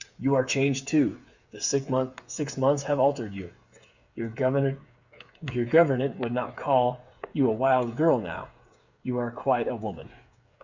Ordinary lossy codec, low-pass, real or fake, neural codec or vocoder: Opus, 64 kbps; 7.2 kHz; fake; codec, 16 kHz, 8 kbps, FreqCodec, smaller model